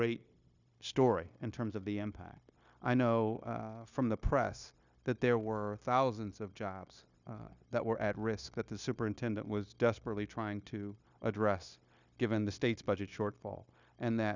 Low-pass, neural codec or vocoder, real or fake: 7.2 kHz; codec, 16 kHz, 0.9 kbps, LongCat-Audio-Codec; fake